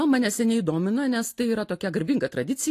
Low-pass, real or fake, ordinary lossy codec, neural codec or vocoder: 14.4 kHz; real; AAC, 48 kbps; none